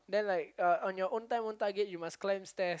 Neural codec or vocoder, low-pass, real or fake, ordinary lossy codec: none; none; real; none